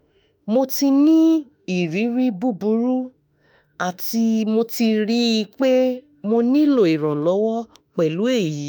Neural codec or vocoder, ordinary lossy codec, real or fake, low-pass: autoencoder, 48 kHz, 32 numbers a frame, DAC-VAE, trained on Japanese speech; none; fake; none